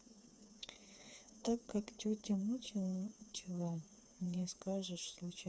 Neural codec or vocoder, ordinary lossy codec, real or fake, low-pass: codec, 16 kHz, 4 kbps, FreqCodec, smaller model; none; fake; none